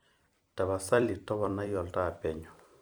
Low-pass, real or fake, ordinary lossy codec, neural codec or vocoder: none; fake; none; vocoder, 44.1 kHz, 128 mel bands every 256 samples, BigVGAN v2